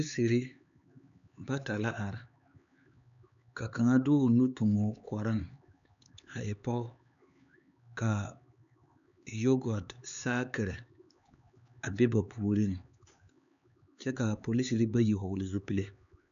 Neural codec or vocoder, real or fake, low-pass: codec, 16 kHz, 4 kbps, X-Codec, HuBERT features, trained on LibriSpeech; fake; 7.2 kHz